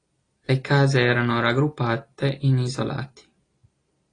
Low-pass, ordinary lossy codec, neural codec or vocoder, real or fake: 9.9 kHz; AAC, 32 kbps; none; real